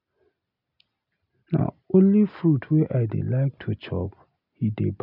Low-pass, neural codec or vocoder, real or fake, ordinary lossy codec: 5.4 kHz; none; real; none